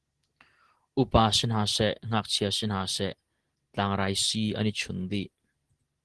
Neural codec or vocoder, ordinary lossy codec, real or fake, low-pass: none; Opus, 16 kbps; real; 10.8 kHz